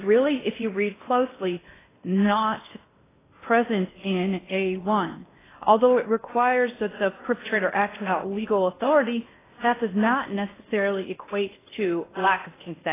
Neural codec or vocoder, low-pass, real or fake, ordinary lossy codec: codec, 16 kHz in and 24 kHz out, 0.6 kbps, FocalCodec, streaming, 4096 codes; 3.6 kHz; fake; AAC, 16 kbps